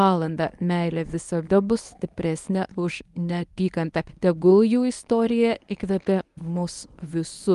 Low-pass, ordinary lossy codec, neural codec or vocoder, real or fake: 10.8 kHz; Opus, 32 kbps; codec, 24 kHz, 0.9 kbps, WavTokenizer, medium speech release version 1; fake